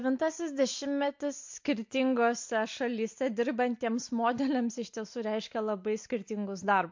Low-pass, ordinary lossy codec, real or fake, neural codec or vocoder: 7.2 kHz; MP3, 48 kbps; real; none